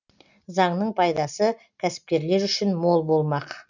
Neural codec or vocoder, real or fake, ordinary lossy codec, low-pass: none; real; none; 7.2 kHz